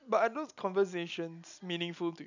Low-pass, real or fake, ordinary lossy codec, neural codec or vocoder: 7.2 kHz; real; none; none